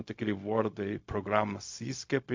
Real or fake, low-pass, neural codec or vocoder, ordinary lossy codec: fake; 7.2 kHz; codec, 16 kHz, 0.4 kbps, LongCat-Audio-Codec; MP3, 48 kbps